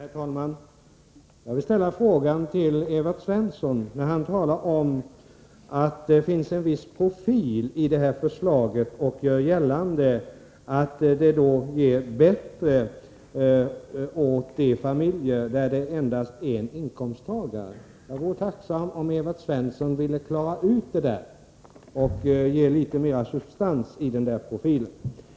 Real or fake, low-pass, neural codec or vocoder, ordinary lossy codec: real; none; none; none